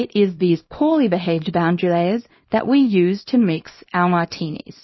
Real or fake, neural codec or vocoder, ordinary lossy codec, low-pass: fake; codec, 24 kHz, 0.9 kbps, WavTokenizer, small release; MP3, 24 kbps; 7.2 kHz